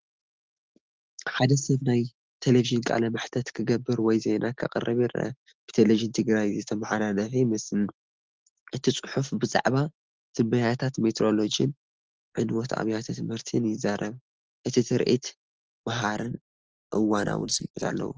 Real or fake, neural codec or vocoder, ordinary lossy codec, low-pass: real; none; Opus, 16 kbps; 7.2 kHz